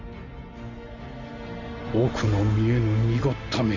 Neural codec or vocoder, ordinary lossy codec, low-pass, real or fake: none; none; 7.2 kHz; real